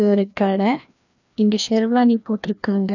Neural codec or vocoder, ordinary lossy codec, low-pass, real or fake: codec, 16 kHz, 1 kbps, FreqCodec, larger model; none; 7.2 kHz; fake